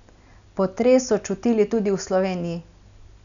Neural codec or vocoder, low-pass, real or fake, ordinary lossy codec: none; 7.2 kHz; real; none